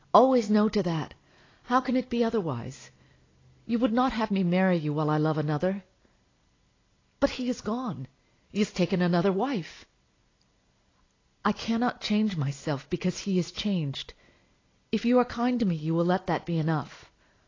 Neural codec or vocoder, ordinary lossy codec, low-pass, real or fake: none; AAC, 32 kbps; 7.2 kHz; real